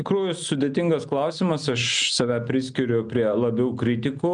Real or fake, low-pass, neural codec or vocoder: real; 9.9 kHz; none